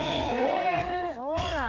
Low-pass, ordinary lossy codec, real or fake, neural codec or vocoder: 7.2 kHz; Opus, 24 kbps; fake; codec, 16 kHz, 4 kbps, FreqCodec, larger model